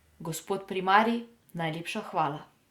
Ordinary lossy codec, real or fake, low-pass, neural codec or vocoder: Opus, 64 kbps; real; 19.8 kHz; none